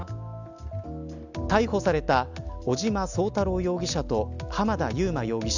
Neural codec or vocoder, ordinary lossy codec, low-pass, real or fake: none; none; 7.2 kHz; real